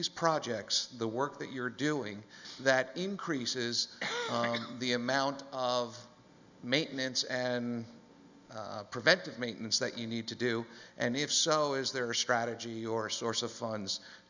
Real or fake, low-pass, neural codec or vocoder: real; 7.2 kHz; none